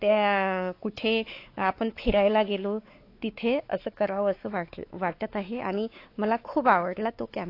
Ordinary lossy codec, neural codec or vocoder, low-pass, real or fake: AAC, 32 kbps; codec, 16 kHz, 4 kbps, X-Codec, WavLM features, trained on Multilingual LibriSpeech; 5.4 kHz; fake